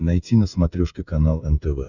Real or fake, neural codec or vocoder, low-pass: fake; codec, 16 kHz, 16 kbps, FreqCodec, smaller model; 7.2 kHz